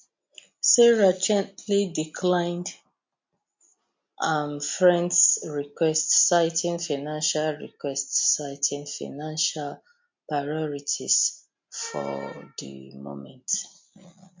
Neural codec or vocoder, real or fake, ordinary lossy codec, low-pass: none; real; MP3, 48 kbps; 7.2 kHz